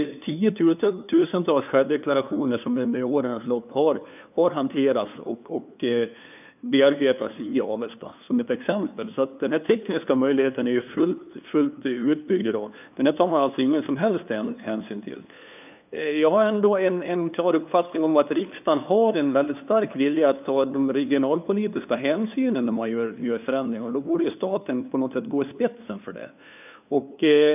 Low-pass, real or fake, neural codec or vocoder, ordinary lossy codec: 3.6 kHz; fake; codec, 16 kHz, 2 kbps, FunCodec, trained on LibriTTS, 25 frames a second; none